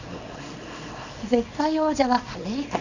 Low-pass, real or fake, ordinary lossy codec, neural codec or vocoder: 7.2 kHz; fake; none; codec, 24 kHz, 0.9 kbps, WavTokenizer, small release